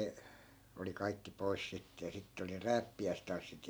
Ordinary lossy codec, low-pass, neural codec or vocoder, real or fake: none; none; none; real